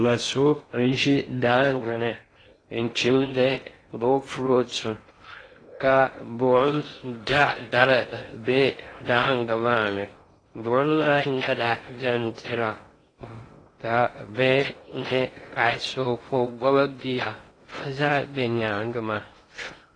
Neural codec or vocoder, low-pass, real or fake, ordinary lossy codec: codec, 16 kHz in and 24 kHz out, 0.6 kbps, FocalCodec, streaming, 2048 codes; 9.9 kHz; fake; AAC, 32 kbps